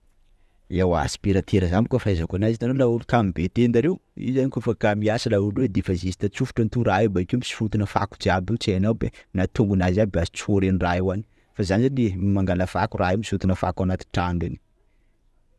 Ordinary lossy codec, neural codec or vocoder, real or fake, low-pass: none; vocoder, 24 kHz, 100 mel bands, Vocos; fake; none